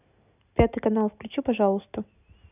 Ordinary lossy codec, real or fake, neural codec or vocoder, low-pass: none; real; none; 3.6 kHz